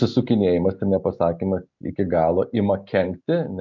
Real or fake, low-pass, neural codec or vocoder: real; 7.2 kHz; none